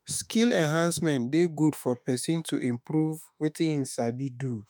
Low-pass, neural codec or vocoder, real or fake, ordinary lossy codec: none; autoencoder, 48 kHz, 32 numbers a frame, DAC-VAE, trained on Japanese speech; fake; none